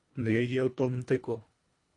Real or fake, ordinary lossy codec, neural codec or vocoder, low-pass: fake; AAC, 48 kbps; codec, 24 kHz, 1.5 kbps, HILCodec; 10.8 kHz